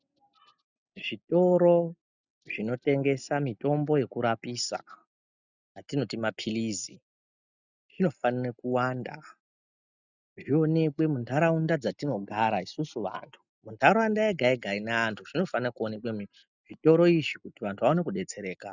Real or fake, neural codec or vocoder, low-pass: real; none; 7.2 kHz